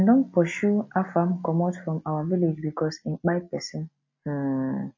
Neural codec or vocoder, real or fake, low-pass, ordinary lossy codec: none; real; 7.2 kHz; MP3, 32 kbps